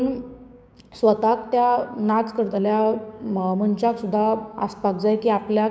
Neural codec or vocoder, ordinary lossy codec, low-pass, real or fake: codec, 16 kHz, 6 kbps, DAC; none; none; fake